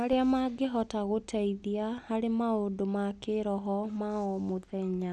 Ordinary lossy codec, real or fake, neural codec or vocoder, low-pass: none; real; none; none